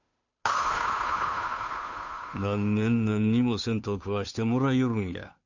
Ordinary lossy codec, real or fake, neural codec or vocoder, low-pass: none; fake; codec, 16 kHz, 2 kbps, FunCodec, trained on Chinese and English, 25 frames a second; 7.2 kHz